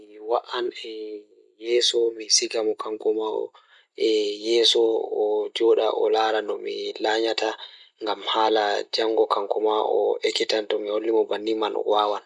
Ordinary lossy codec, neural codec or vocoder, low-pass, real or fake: none; none; 10.8 kHz; real